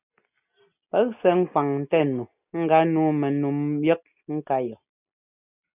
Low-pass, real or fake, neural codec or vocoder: 3.6 kHz; real; none